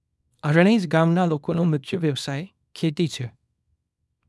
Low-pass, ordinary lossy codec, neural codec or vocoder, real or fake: none; none; codec, 24 kHz, 0.9 kbps, WavTokenizer, small release; fake